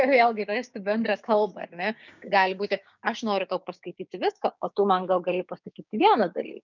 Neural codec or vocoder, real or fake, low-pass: codec, 16 kHz, 6 kbps, DAC; fake; 7.2 kHz